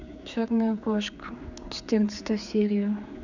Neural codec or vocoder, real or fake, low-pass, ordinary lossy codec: codec, 16 kHz, 4 kbps, X-Codec, HuBERT features, trained on general audio; fake; 7.2 kHz; none